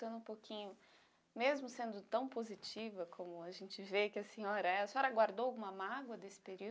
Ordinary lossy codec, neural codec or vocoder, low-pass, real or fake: none; none; none; real